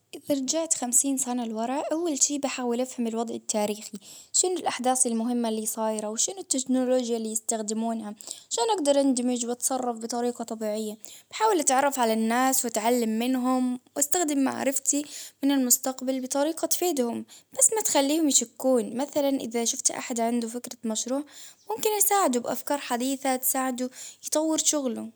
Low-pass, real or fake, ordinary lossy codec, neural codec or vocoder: none; real; none; none